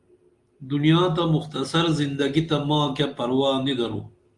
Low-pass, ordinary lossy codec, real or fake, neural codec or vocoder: 10.8 kHz; Opus, 32 kbps; real; none